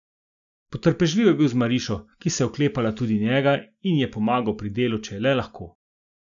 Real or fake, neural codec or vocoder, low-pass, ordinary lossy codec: real; none; 7.2 kHz; none